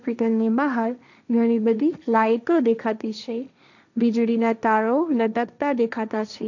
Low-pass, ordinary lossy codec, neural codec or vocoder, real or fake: 7.2 kHz; none; codec, 16 kHz, 1.1 kbps, Voila-Tokenizer; fake